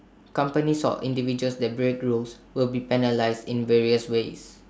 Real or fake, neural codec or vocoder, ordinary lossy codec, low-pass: real; none; none; none